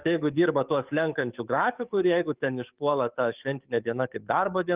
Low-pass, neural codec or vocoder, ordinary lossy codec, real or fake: 3.6 kHz; codec, 44.1 kHz, 7.8 kbps, DAC; Opus, 32 kbps; fake